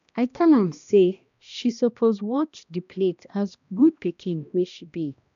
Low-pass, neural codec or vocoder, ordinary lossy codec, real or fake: 7.2 kHz; codec, 16 kHz, 1 kbps, X-Codec, HuBERT features, trained on balanced general audio; none; fake